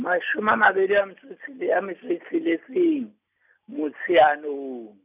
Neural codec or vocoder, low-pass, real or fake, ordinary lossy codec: none; 3.6 kHz; real; none